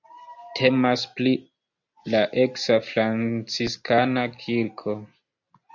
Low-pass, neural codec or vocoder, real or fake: 7.2 kHz; none; real